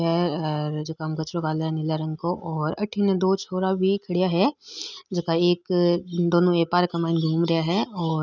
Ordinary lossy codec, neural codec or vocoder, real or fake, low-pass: none; none; real; 7.2 kHz